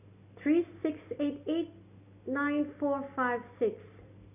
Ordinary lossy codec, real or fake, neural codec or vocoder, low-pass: none; real; none; 3.6 kHz